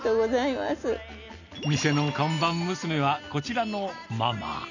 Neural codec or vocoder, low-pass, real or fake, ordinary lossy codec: none; 7.2 kHz; real; none